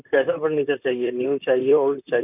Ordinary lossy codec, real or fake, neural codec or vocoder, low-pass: none; fake; vocoder, 44.1 kHz, 128 mel bands, Pupu-Vocoder; 3.6 kHz